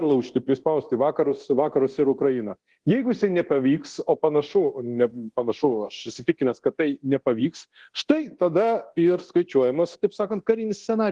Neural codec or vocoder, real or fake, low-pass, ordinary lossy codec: codec, 24 kHz, 0.9 kbps, DualCodec; fake; 10.8 kHz; Opus, 16 kbps